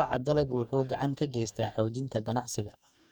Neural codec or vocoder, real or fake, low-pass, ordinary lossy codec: codec, 44.1 kHz, 2.6 kbps, DAC; fake; 19.8 kHz; none